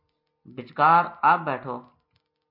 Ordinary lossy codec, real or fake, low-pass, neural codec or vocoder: MP3, 32 kbps; real; 5.4 kHz; none